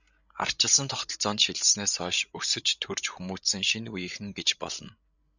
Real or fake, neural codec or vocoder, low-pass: fake; codec, 16 kHz, 16 kbps, FreqCodec, larger model; 7.2 kHz